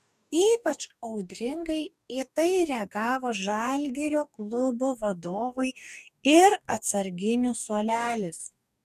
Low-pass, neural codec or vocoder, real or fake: 14.4 kHz; codec, 44.1 kHz, 2.6 kbps, DAC; fake